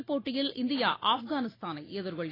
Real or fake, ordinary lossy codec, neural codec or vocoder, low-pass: real; AAC, 24 kbps; none; 5.4 kHz